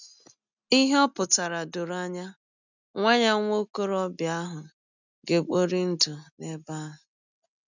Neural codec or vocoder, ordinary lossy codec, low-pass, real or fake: none; none; 7.2 kHz; real